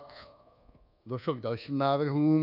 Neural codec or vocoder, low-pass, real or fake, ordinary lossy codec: codec, 24 kHz, 1.2 kbps, DualCodec; 5.4 kHz; fake; AAC, 48 kbps